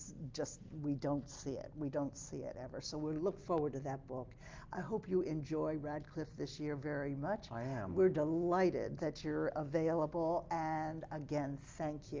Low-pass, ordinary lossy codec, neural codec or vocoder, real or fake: 7.2 kHz; Opus, 32 kbps; none; real